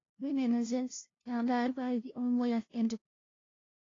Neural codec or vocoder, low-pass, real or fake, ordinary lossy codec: codec, 16 kHz, 0.5 kbps, FunCodec, trained on LibriTTS, 25 frames a second; 7.2 kHz; fake; AAC, 32 kbps